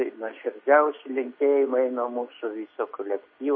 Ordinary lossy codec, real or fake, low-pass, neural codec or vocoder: MP3, 24 kbps; real; 7.2 kHz; none